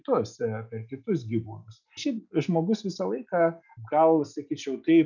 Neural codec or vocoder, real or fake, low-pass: none; real; 7.2 kHz